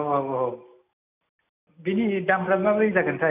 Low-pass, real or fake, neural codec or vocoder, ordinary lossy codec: 3.6 kHz; real; none; none